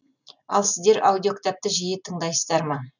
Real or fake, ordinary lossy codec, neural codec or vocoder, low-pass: real; none; none; 7.2 kHz